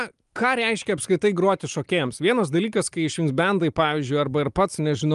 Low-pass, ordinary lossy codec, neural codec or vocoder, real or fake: 10.8 kHz; Opus, 32 kbps; none; real